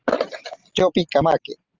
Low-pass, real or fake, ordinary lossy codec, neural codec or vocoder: 7.2 kHz; real; Opus, 32 kbps; none